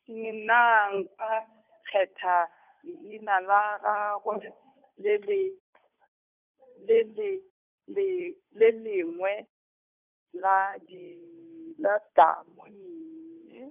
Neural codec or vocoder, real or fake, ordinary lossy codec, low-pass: codec, 16 kHz, 2 kbps, FunCodec, trained on Chinese and English, 25 frames a second; fake; none; 3.6 kHz